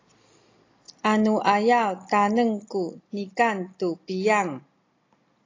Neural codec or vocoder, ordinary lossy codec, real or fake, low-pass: none; AAC, 32 kbps; real; 7.2 kHz